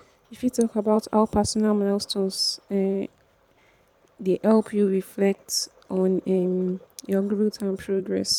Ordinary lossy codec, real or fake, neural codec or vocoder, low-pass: none; fake; vocoder, 44.1 kHz, 128 mel bands every 512 samples, BigVGAN v2; 19.8 kHz